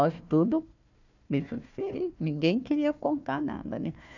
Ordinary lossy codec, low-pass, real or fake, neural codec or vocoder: none; 7.2 kHz; fake; codec, 16 kHz, 1 kbps, FunCodec, trained on Chinese and English, 50 frames a second